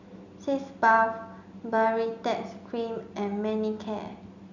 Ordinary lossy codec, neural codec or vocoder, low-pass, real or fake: none; none; 7.2 kHz; real